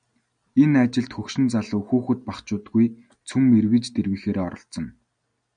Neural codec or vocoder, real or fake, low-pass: none; real; 9.9 kHz